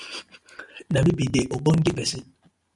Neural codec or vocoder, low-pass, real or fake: none; 10.8 kHz; real